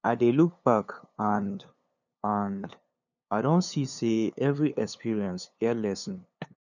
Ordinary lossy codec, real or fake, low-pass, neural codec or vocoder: none; fake; 7.2 kHz; codec, 16 kHz, 2 kbps, FunCodec, trained on LibriTTS, 25 frames a second